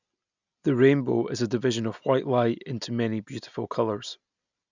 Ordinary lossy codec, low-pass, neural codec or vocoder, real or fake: none; 7.2 kHz; none; real